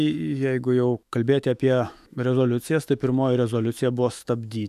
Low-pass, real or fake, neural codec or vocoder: 14.4 kHz; fake; autoencoder, 48 kHz, 128 numbers a frame, DAC-VAE, trained on Japanese speech